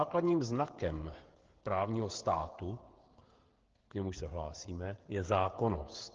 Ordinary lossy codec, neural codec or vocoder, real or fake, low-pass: Opus, 16 kbps; codec, 16 kHz, 16 kbps, FreqCodec, smaller model; fake; 7.2 kHz